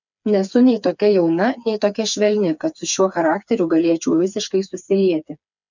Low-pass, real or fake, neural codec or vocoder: 7.2 kHz; fake; codec, 16 kHz, 4 kbps, FreqCodec, smaller model